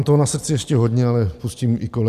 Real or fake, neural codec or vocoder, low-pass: real; none; 14.4 kHz